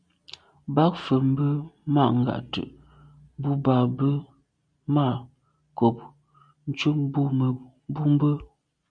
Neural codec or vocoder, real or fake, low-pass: none; real; 9.9 kHz